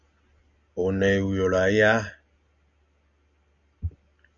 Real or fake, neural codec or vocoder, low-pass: real; none; 7.2 kHz